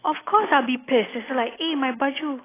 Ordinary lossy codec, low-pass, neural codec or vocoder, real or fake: AAC, 16 kbps; 3.6 kHz; none; real